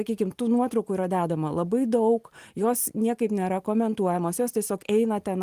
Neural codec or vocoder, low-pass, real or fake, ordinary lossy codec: none; 14.4 kHz; real; Opus, 24 kbps